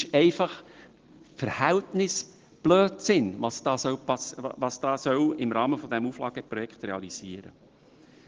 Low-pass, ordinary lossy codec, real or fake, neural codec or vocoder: 7.2 kHz; Opus, 16 kbps; real; none